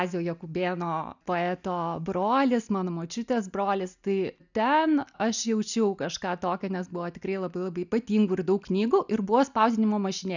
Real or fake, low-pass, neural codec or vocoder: real; 7.2 kHz; none